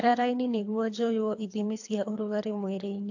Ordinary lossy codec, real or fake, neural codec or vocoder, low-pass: AAC, 48 kbps; fake; codec, 24 kHz, 3 kbps, HILCodec; 7.2 kHz